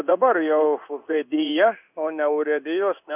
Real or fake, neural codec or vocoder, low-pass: fake; codec, 16 kHz in and 24 kHz out, 1 kbps, XY-Tokenizer; 3.6 kHz